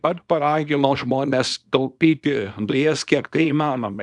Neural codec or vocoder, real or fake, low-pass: codec, 24 kHz, 0.9 kbps, WavTokenizer, small release; fake; 10.8 kHz